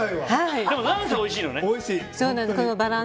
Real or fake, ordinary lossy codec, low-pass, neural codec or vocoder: real; none; none; none